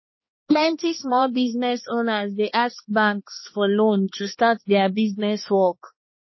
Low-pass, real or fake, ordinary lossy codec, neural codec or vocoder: 7.2 kHz; fake; MP3, 24 kbps; codec, 16 kHz, 2 kbps, X-Codec, HuBERT features, trained on balanced general audio